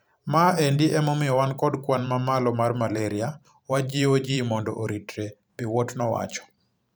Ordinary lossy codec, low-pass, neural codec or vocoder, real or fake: none; none; vocoder, 44.1 kHz, 128 mel bands every 512 samples, BigVGAN v2; fake